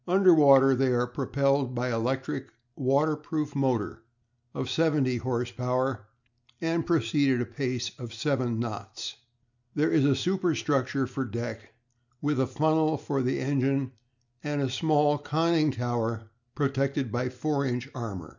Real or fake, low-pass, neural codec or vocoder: real; 7.2 kHz; none